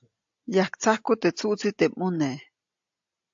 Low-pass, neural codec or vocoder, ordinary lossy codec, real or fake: 7.2 kHz; none; AAC, 64 kbps; real